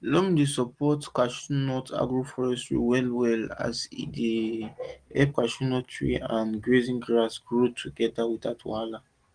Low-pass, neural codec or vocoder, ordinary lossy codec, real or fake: 9.9 kHz; none; Opus, 24 kbps; real